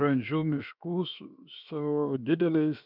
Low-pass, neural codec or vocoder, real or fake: 5.4 kHz; codec, 16 kHz, 0.7 kbps, FocalCodec; fake